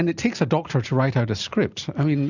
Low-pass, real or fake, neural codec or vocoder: 7.2 kHz; real; none